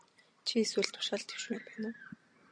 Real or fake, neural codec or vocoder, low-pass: real; none; 9.9 kHz